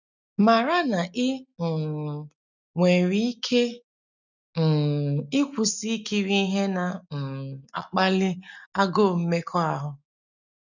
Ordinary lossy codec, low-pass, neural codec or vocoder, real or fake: none; 7.2 kHz; none; real